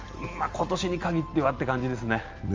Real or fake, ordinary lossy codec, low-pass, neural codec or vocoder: real; Opus, 32 kbps; 7.2 kHz; none